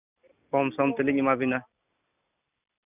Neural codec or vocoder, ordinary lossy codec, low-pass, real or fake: none; none; 3.6 kHz; real